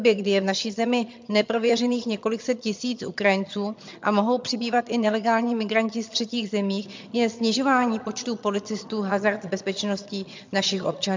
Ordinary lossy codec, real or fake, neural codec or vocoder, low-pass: MP3, 64 kbps; fake; vocoder, 22.05 kHz, 80 mel bands, HiFi-GAN; 7.2 kHz